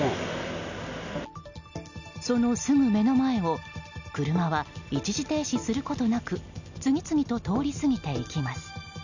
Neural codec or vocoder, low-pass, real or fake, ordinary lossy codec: none; 7.2 kHz; real; none